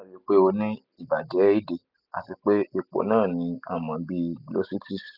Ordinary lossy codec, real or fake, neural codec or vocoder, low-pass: none; real; none; 5.4 kHz